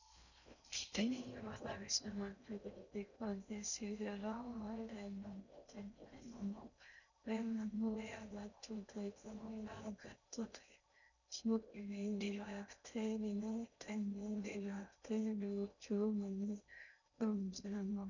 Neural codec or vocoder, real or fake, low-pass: codec, 16 kHz in and 24 kHz out, 0.6 kbps, FocalCodec, streaming, 4096 codes; fake; 7.2 kHz